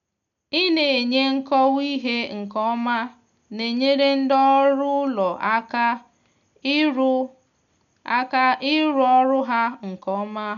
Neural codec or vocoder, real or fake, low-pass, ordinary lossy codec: none; real; 7.2 kHz; none